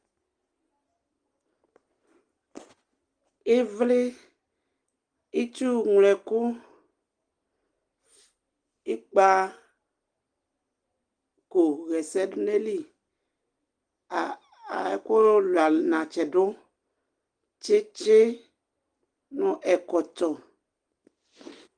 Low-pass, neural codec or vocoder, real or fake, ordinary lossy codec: 9.9 kHz; none; real; Opus, 24 kbps